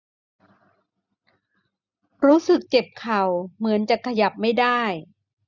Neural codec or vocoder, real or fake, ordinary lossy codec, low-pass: none; real; none; 7.2 kHz